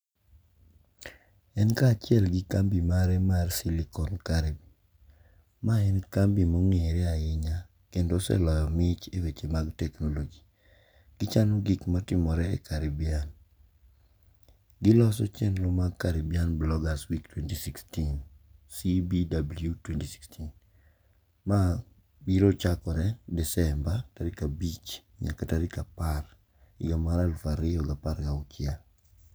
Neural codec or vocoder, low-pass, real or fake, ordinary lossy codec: none; none; real; none